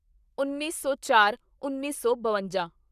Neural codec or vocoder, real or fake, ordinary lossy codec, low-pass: codec, 44.1 kHz, 7.8 kbps, Pupu-Codec; fake; none; 14.4 kHz